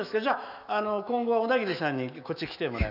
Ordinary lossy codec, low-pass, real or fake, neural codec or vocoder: none; 5.4 kHz; fake; autoencoder, 48 kHz, 128 numbers a frame, DAC-VAE, trained on Japanese speech